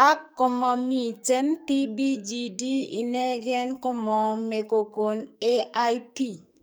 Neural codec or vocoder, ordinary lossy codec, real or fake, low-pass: codec, 44.1 kHz, 2.6 kbps, SNAC; none; fake; none